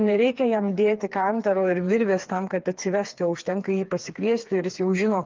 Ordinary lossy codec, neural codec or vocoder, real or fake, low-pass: Opus, 32 kbps; codec, 16 kHz, 4 kbps, FreqCodec, smaller model; fake; 7.2 kHz